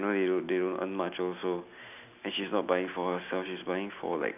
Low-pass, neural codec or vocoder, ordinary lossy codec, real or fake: 3.6 kHz; none; none; real